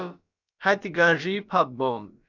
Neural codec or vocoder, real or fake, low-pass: codec, 16 kHz, about 1 kbps, DyCAST, with the encoder's durations; fake; 7.2 kHz